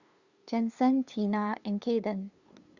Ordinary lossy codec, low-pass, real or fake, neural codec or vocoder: Opus, 64 kbps; 7.2 kHz; fake; codec, 16 kHz, 2 kbps, FunCodec, trained on LibriTTS, 25 frames a second